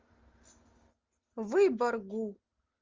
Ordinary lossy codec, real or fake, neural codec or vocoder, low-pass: Opus, 32 kbps; real; none; 7.2 kHz